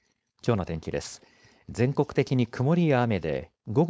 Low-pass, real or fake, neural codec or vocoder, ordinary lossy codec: none; fake; codec, 16 kHz, 4.8 kbps, FACodec; none